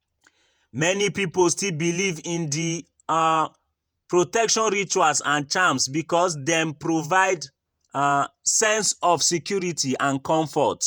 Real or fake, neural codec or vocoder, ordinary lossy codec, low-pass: fake; vocoder, 48 kHz, 128 mel bands, Vocos; none; none